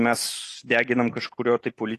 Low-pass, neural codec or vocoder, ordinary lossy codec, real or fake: 14.4 kHz; none; AAC, 48 kbps; real